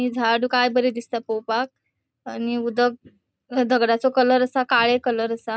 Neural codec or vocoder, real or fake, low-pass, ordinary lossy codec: none; real; none; none